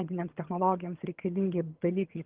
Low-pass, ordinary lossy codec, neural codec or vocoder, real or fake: 3.6 kHz; Opus, 16 kbps; vocoder, 22.05 kHz, 80 mel bands, HiFi-GAN; fake